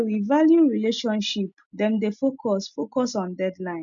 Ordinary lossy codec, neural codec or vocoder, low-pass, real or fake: none; none; 7.2 kHz; real